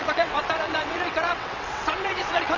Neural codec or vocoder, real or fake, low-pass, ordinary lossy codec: vocoder, 22.05 kHz, 80 mel bands, WaveNeXt; fake; 7.2 kHz; none